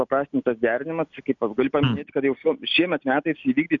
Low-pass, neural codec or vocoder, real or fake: 7.2 kHz; none; real